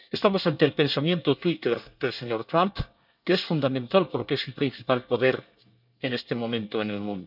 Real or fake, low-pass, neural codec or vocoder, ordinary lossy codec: fake; 5.4 kHz; codec, 24 kHz, 1 kbps, SNAC; none